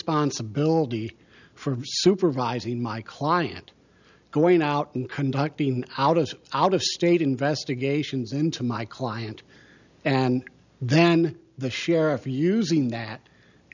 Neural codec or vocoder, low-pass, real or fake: none; 7.2 kHz; real